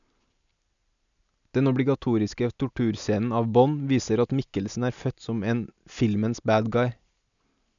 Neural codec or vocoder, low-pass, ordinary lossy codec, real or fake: none; 7.2 kHz; none; real